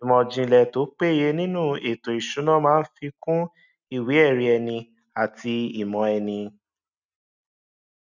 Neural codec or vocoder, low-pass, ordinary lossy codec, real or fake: none; 7.2 kHz; none; real